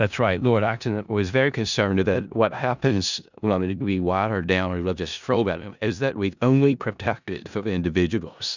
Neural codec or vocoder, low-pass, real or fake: codec, 16 kHz in and 24 kHz out, 0.4 kbps, LongCat-Audio-Codec, four codebook decoder; 7.2 kHz; fake